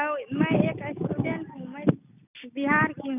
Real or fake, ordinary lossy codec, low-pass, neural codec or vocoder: real; none; 3.6 kHz; none